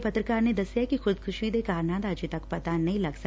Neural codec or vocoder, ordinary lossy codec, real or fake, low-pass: none; none; real; none